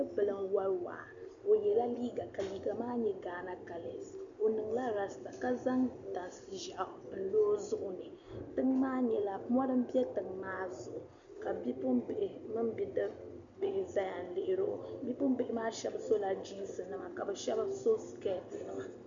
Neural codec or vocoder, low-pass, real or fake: none; 7.2 kHz; real